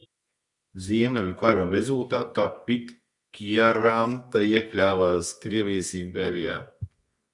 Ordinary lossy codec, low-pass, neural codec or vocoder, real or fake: Opus, 64 kbps; 10.8 kHz; codec, 24 kHz, 0.9 kbps, WavTokenizer, medium music audio release; fake